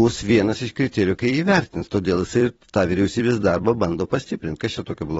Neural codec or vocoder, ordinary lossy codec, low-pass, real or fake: vocoder, 44.1 kHz, 128 mel bands every 256 samples, BigVGAN v2; AAC, 24 kbps; 19.8 kHz; fake